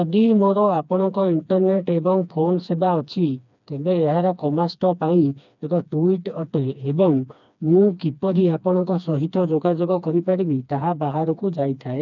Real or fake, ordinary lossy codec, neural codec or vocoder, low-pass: fake; none; codec, 16 kHz, 2 kbps, FreqCodec, smaller model; 7.2 kHz